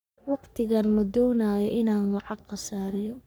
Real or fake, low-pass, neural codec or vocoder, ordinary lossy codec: fake; none; codec, 44.1 kHz, 3.4 kbps, Pupu-Codec; none